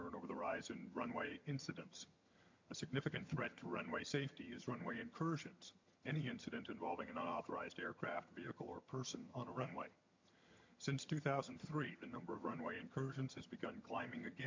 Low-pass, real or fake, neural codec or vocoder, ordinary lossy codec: 7.2 kHz; fake; vocoder, 22.05 kHz, 80 mel bands, HiFi-GAN; MP3, 48 kbps